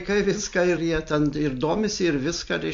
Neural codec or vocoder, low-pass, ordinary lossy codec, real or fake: none; 7.2 kHz; MP3, 48 kbps; real